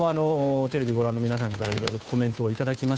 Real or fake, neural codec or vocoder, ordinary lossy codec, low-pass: fake; codec, 16 kHz, 2 kbps, FunCodec, trained on Chinese and English, 25 frames a second; none; none